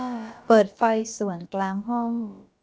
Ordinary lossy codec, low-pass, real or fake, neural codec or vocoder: none; none; fake; codec, 16 kHz, about 1 kbps, DyCAST, with the encoder's durations